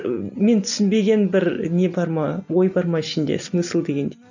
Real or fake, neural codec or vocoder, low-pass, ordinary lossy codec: real; none; 7.2 kHz; none